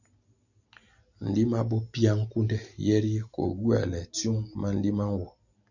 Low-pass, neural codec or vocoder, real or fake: 7.2 kHz; none; real